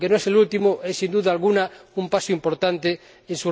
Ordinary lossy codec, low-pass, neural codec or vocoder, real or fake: none; none; none; real